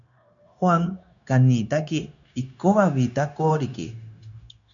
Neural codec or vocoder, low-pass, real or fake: codec, 16 kHz, 0.9 kbps, LongCat-Audio-Codec; 7.2 kHz; fake